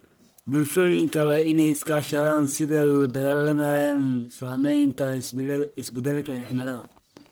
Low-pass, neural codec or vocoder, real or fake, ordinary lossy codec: none; codec, 44.1 kHz, 1.7 kbps, Pupu-Codec; fake; none